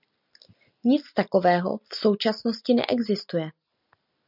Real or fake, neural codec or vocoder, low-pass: real; none; 5.4 kHz